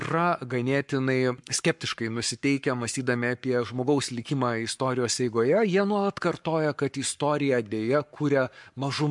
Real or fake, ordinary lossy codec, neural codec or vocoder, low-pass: fake; MP3, 64 kbps; codec, 44.1 kHz, 7.8 kbps, Pupu-Codec; 10.8 kHz